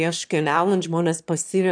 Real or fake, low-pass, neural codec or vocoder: fake; 9.9 kHz; autoencoder, 22.05 kHz, a latent of 192 numbers a frame, VITS, trained on one speaker